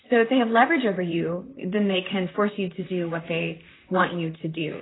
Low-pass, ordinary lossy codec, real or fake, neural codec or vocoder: 7.2 kHz; AAC, 16 kbps; fake; vocoder, 44.1 kHz, 128 mel bands, Pupu-Vocoder